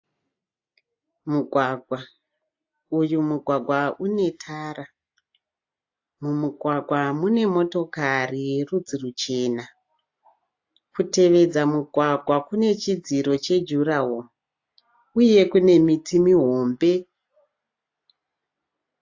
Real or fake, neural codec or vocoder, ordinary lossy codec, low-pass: real; none; AAC, 48 kbps; 7.2 kHz